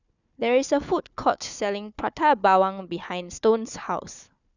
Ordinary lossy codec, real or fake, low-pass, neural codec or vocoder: none; fake; 7.2 kHz; codec, 16 kHz, 4 kbps, FunCodec, trained on Chinese and English, 50 frames a second